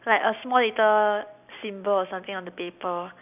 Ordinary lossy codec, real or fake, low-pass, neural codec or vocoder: none; real; 3.6 kHz; none